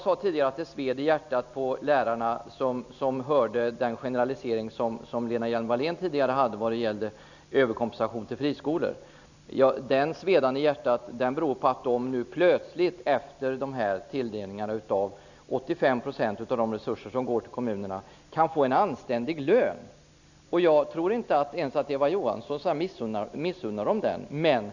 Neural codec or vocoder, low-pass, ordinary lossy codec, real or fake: none; 7.2 kHz; none; real